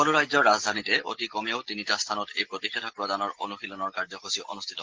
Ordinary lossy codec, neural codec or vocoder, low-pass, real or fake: Opus, 16 kbps; none; 7.2 kHz; real